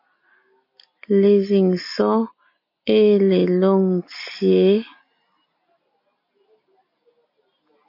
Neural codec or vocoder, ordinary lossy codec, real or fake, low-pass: none; MP3, 32 kbps; real; 5.4 kHz